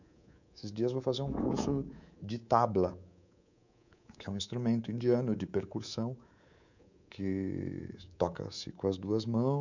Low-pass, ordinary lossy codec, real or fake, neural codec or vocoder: 7.2 kHz; none; fake; codec, 24 kHz, 3.1 kbps, DualCodec